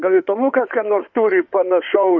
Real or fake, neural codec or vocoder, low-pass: fake; codec, 16 kHz in and 24 kHz out, 2.2 kbps, FireRedTTS-2 codec; 7.2 kHz